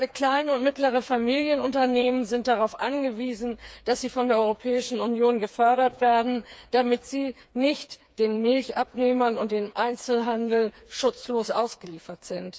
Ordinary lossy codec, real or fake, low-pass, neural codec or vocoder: none; fake; none; codec, 16 kHz, 4 kbps, FreqCodec, smaller model